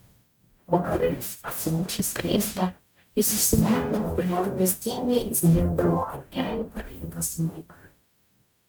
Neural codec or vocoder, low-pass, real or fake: codec, 44.1 kHz, 0.9 kbps, DAC; 19.8 kHz; fake